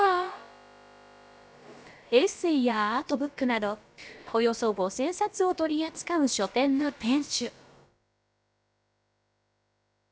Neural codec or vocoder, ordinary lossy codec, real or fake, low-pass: codec, 16 kHz, about 1 kbps, DyCAST, with the encoder's durations; none; fake; none